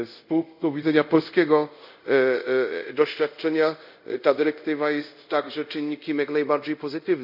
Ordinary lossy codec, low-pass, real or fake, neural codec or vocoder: none; 5.4 kHz; fake; codec, 24 kHz, 0.5 kbps, DualCodec